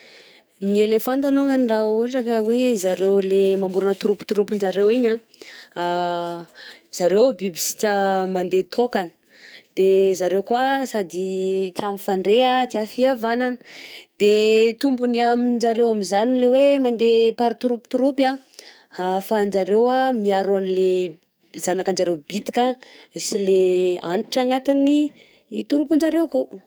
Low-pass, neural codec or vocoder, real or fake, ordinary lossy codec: none; codec, 44.1 kHz, 2.6 kbps, SNAC; fake; none